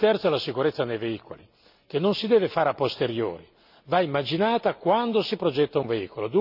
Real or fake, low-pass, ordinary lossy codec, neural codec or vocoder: real; 5.4 kHz; none; none